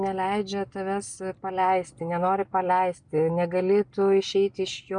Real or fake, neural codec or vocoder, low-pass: real; none; 9.9 kHz